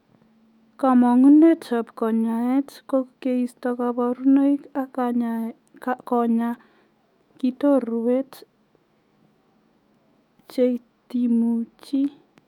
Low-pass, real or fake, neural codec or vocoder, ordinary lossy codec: 19.8 kHz; fake; autoencoder, 48 kHz, 128 numbers a frame, DAC-VAE, trained on Japanese speech; none